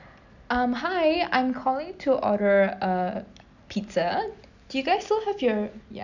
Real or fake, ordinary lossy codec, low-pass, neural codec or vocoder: real; none; 7.2 kHz; none